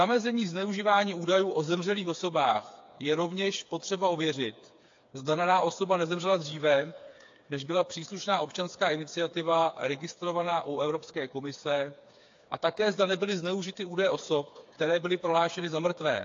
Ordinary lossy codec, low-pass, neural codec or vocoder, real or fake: AAC, 48 kbps; 7.2 kHz; codec, 16 kHz, 4 kbps, FreqCodec, smaller model; fake